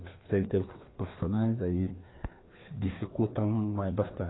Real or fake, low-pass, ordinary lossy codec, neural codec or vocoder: fake; 7.2 kHz; AAC, 16 kbps; codec, 16 kHz, 2 kbps, FreqCodec, larger model